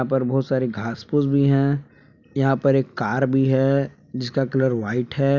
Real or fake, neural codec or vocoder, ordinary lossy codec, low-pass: real; none; none; 7.2 kHz